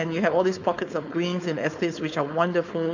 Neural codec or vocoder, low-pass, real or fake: codec, 16 kHz, 4.8 kbps, FACodec; 7.2 kHz; fake